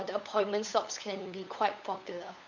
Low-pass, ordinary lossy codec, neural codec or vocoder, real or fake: 7.2 kHz; none; codec, 16 kHz, 8 kbps, FunCodec, trained on LibriTTS, 25 frames a second; fake